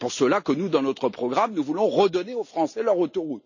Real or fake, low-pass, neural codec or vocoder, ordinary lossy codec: real; 7.2 kHz; none; none